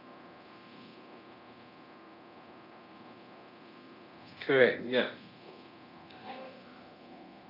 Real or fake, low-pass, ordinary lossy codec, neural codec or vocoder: fake; 5.4 kHz; none; codec, 24 kHz, 0.9 kbps, DualCodec